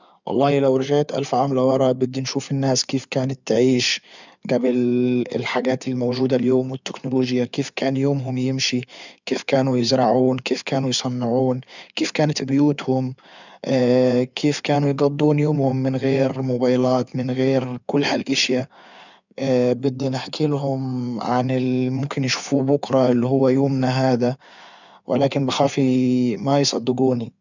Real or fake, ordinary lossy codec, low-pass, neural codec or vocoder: fake; none; 7.2 kHz; codec, 16 kHz in and 24 kHz out, 2.2 kbps, FireRedTTS-2 codec